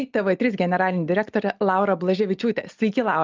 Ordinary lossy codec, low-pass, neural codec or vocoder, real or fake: Opus, 24 kbps; 7.2 kHz; none; real